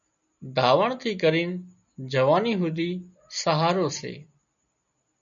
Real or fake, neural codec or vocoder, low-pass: real; none; 7.2 kHz